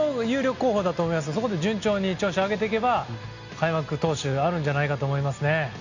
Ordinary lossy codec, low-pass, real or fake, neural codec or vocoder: Opus, 64 kbps; 7.2 kHz; real; none